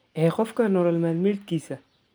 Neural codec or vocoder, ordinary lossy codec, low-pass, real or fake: none; none; none; real